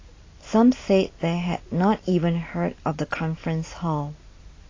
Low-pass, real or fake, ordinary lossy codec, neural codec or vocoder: 7.2 kHz; real; AAC, 32 kbps; none